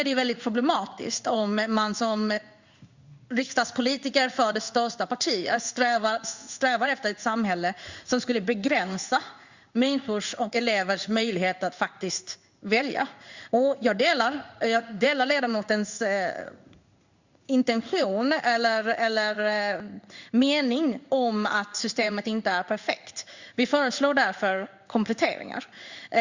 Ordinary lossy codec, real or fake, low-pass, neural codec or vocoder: Opus, 64 kbps; fake; 7.2 kHz; codec, 16 kHz in and 24 kHz out, 1 kbps, XY-Tokenizer